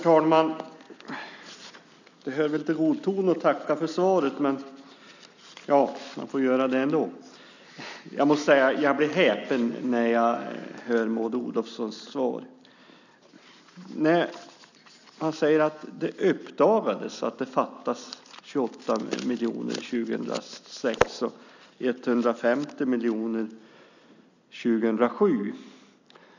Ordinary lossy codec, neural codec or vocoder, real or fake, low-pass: none; none; real; 7.2 kHz